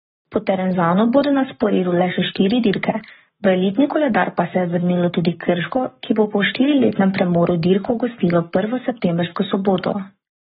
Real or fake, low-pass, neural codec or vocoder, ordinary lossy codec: fake; 19.8 kHz; codec, 44.1 kHz, 7.8 kbps, Pupu-Codec; AAC, 16 kbps